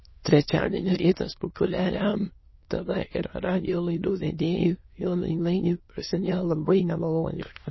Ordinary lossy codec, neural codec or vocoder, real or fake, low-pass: MP3, 24 kbps; autoencoder, 22.05 kHz, a latent of 192 numbers a frame, VITS, trained on many speakers; fake; 7.2 kHz